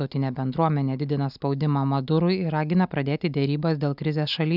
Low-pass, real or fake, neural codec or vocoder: 5.4 kHz; real; none